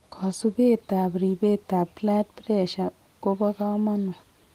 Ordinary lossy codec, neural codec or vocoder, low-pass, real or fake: Opus, 24 kbps; none; 14.4 kHz; real